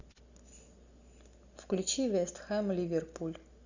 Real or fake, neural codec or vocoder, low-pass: real; none; 7.2 kHz